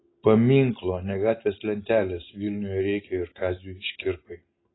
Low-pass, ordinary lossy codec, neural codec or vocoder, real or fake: 7.2 kHz; AAC, 16 kbps; none; real